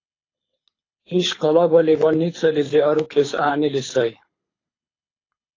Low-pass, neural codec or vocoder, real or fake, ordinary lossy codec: 7.2 kHz; codec, 24 kHz, 6 kbps, HILCodec; fake; AAC, 32 kbps